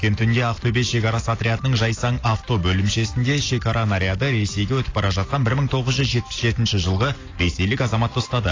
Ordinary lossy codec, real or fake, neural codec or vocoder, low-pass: AAC, 32 kbps; real; none; 7.2 kHz